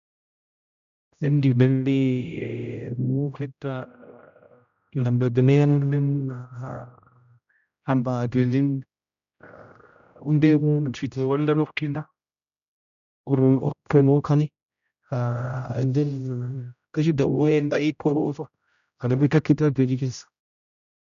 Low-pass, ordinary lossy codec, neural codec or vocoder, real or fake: 7.2 kHz; none; codec, 16 kHz, 0.5 kbps, X-Codec, HuBERT features, trained on general audio; fake